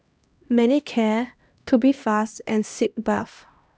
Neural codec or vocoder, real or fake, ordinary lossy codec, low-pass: codec, 16 kHz, 1 kbps, X-Codec, HuBERT features, trained on LibriSpeech; fake; none; none